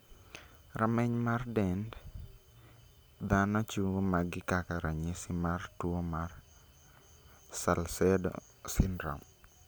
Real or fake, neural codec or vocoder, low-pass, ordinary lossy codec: real; none; none; none